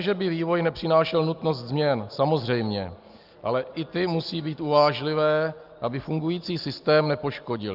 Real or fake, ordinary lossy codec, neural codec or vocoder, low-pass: real; Opus, 24 kbps; none; 5.4 kHz